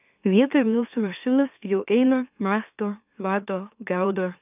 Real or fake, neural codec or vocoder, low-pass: fake; autoencoder, 44.1 kHz, a latent of 192 numbers a frame, MeloTTS; 3.6 kHz